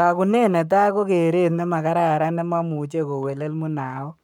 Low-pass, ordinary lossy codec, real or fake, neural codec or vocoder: 19.8 kHz; none; fake; codec, 44.1 kHz, 7.8 kbps, Pupu-Codec